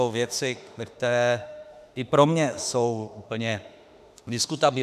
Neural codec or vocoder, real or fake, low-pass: autoencoder, 48 kHz, 32 numbers a frame, DAC-VAE, trained on Japanese speech; fake; 14.4 kHz